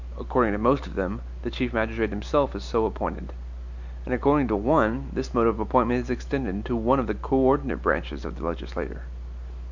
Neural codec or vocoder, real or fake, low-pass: none; real; 7.2 kHz